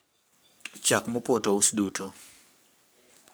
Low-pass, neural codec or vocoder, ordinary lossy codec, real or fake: none; codec, 44.1 kHz, 3.4 kbps, Pupu-Codec; none; fake